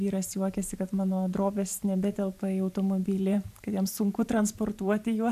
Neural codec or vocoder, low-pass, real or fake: vocoder, 44.1 kHz, 128 mel bands every 512 samples, BigVGAN v2; 14.4 kHz; fake